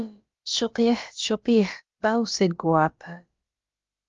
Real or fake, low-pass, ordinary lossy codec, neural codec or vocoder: fake; 7.2 kHz; Opus, 32 kbps; codec, 16 kHz, about 1 kbps, DyCAST, with the encoder's durations